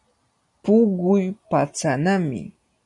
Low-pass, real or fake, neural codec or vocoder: 10.8 kHz; real; none